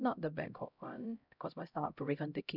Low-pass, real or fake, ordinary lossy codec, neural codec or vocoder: 5.4 kHz; fake; none; codec, 16 kHz, 0.5 kbps, X-Codec, HuBERT features, trained on LibriSpeech